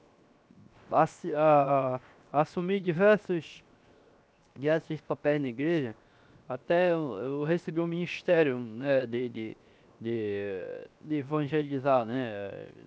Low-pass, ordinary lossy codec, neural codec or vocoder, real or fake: none; none; codec, 16 kHz, 0.7 kbps, FocalCodec; fake